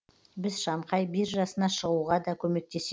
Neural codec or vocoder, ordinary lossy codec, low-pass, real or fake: none; none; none; real